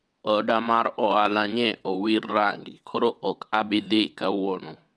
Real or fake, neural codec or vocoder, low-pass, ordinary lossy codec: fake; vocoder, 22.05 kHz, 80 mel bands, WaveNeXt; none; none